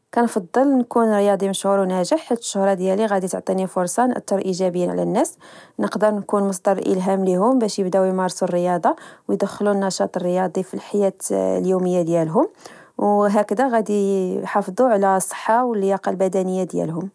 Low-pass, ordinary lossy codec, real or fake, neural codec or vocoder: none; none; real; none